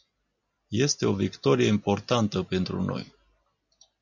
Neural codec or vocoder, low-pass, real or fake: none; 7.2 kHz; real